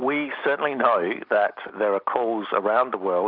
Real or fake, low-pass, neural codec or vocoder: real; 5.4 kHz; none